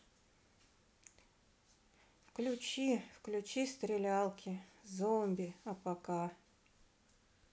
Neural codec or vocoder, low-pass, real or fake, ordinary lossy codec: none; none; real; none